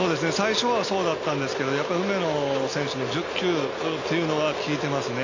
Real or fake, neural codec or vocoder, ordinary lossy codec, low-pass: real; none; AAC, 48 kbps; 7.2 kHz